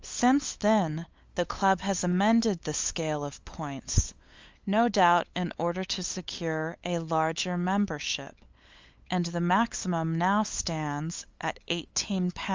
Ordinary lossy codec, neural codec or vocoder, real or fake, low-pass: Opus, 32 kbps; codec, 16 kHz, 8 kbps, FunCodec, trained on LibriTTS, 25 frames a second; fake; 7.2 kHz